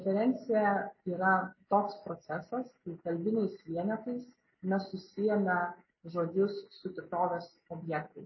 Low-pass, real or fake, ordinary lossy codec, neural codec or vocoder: 7.2 kHz; real; MP3, 24 kbps; none